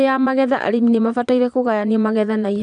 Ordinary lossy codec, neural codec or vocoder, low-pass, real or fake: none; vocoder, 22.05 kHz, 80 mel bands, Vocos; 9.9 kHz; fake